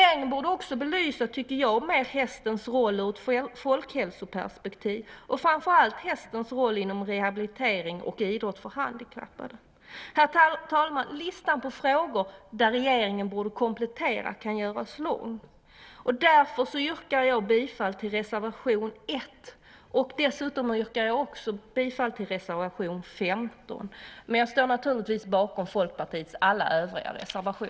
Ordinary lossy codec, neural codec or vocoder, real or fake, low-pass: none; none; real; none